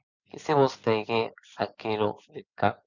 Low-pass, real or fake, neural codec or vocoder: 7.2 kHz; real; none